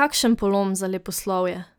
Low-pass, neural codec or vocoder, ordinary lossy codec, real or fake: none; none; none; real